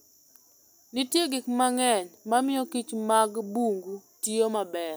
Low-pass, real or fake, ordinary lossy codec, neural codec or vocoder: none; real; none; none